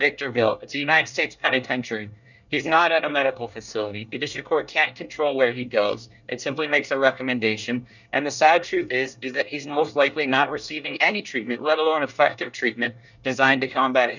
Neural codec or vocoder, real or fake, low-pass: codec, 24 kHz, 1 kbps, SNAC; fake; 7.2 kHz